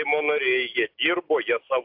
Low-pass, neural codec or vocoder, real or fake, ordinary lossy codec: 5.4 kHz; none; real; AAC, 48 kbps